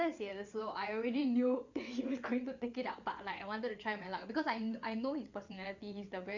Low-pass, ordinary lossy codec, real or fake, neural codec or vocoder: 7.2 kHz; Opus, 64 kbps; fake; vocoder, 22.05 kHz, 80 mel bands, WaveNeXt